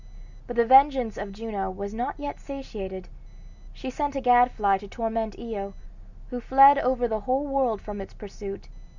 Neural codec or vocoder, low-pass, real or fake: none; 7.2 kHz; real